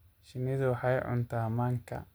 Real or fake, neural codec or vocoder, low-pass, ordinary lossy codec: real; none; none; none